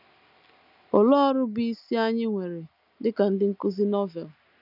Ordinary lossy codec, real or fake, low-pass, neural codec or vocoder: none; real; 5.4 kHz; none